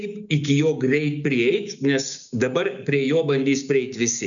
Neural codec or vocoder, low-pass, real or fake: codec, 16 kHz, 6 kbps, DAC; 7.2 kHz; fake